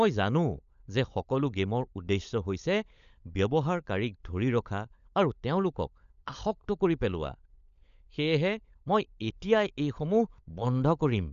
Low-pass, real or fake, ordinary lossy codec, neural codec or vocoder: 7.2 kHz; fake; none; codec, 16 kHz, 16 kbps, FunCodec, trained on LibriTTS, 50 frames a second